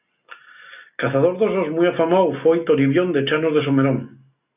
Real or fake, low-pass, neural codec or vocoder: real; 3.6 kHz; none